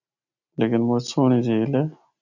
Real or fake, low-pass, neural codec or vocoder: real; 7.2 kHz; none